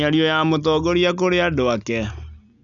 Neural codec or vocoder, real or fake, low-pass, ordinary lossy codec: none; real; 7.2 kHz; none